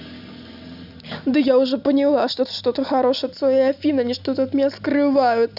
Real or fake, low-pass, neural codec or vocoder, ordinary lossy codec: real; 5.4 kHz; none; none